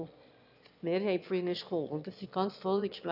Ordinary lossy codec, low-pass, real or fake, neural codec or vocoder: none; 5.4 kHz; fake; autoencoder, 22.05 kHz, a latent of 192 numbers a frame, VITS, trained on one speaker